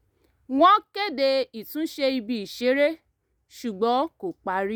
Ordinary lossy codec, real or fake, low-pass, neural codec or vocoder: none; real; none; none